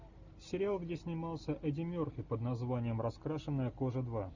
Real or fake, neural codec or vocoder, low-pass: real; none; 7.2 kHz